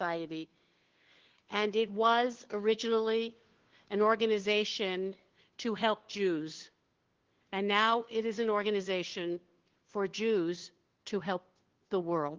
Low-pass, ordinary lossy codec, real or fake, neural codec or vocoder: 7.2 kHz; Opus, 16 kbps; fake; codec, 16 kHz, 2 kbps, FunCodec, trained on Chinese and English, 25 frames a second